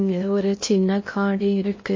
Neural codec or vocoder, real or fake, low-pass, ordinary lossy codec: codec, 16 kHz in and 24 kHz out, 0.6 kbps, FocalCodec, streaming, 2048 codes; fake; 7.2 kHz; MP3, 32 kbps